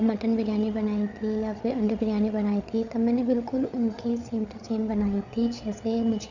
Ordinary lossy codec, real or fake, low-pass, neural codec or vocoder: none; fake; 7.2 kHz; codec, 16 kHz, 8 kbps, FreqCodec, larger model